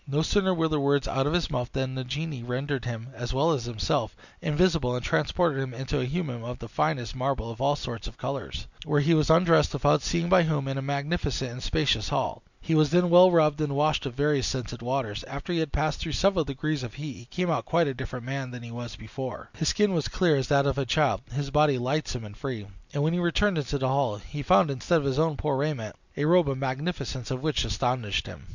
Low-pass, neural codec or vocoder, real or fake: 7.2 kHz; none; real